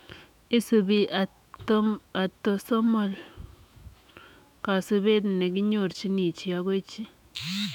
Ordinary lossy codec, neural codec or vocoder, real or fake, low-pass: none; autoencoder, 48 kHz, 128 numbers a frame, DAC-VAE, trained on Japanese speech; fake; 19.8 kHz